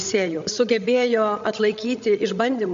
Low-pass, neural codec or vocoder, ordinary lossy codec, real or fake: 7.2 kHz; codec, 16 kHz, 16 kbps, FreqCodec, larger model; MP3, 48 kbps; fake